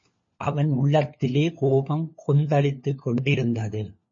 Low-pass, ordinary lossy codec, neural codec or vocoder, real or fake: 7.2 kHz; MP3, 32 kbps; codec, 16 kHz, 4 kbps, FunCodec, trained on LibriTTS, 50 frames a second; fake